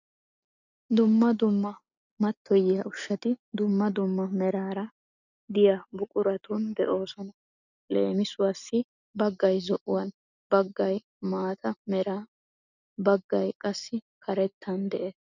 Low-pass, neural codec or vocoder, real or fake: 7.2 kHz; none; real